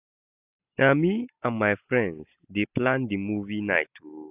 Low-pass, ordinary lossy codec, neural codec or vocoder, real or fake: 3.6 kHz; AAC, 32 kbps; none; real